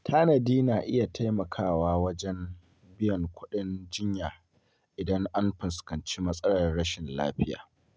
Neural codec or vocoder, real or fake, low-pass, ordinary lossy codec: none; real; none; none